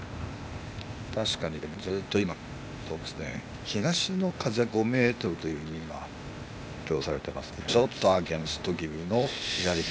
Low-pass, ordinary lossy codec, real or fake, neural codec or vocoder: none; none; fake; codec, 16 kHz, 0.8 kbps, ZipCodec